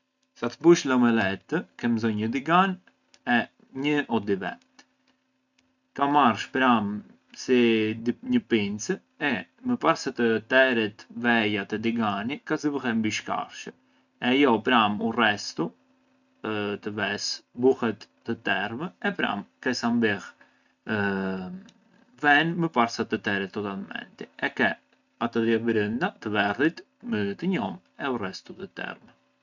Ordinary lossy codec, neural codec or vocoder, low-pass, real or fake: none; none; 7.2 kHz; real